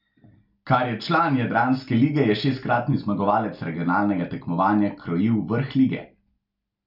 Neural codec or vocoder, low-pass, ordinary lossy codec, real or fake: none; 5.4 kHz; none; real